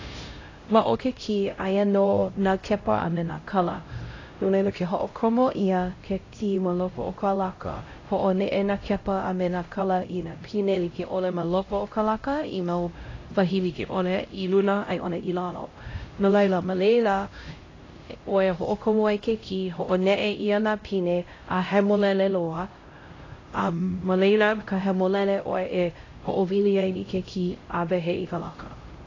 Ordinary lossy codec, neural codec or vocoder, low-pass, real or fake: AAC, 32 kbps; codec, 16 kHz, 0.5 kbps, X-Codec, HuBERT features, trained on LibriSpeech; 7.2 kHz; fake